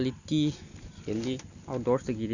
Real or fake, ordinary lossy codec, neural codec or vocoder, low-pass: real; none; none; 7.2 kHz